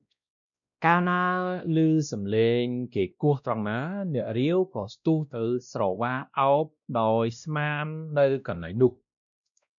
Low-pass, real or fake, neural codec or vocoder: 7.2 kHz; fake; codec, 16 kHz, 1 kbps, X-Codec, WavLM features, trained on Multilingual LibriSpeech